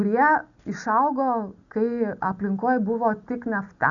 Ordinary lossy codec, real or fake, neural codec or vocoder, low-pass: MP3, 96 kbps; real; none; 7.2 kHz